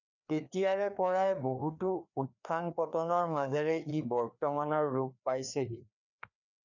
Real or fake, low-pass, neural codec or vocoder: fake; 7.2 kHz; codec, 16 kHz, 2 kbps, FreqCodec, larger model